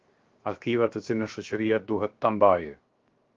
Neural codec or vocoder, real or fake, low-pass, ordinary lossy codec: codec, 16 kHz, 0.7 kbps, FocalCodec; fake; 7.2 kHz; Opus, 32 kbps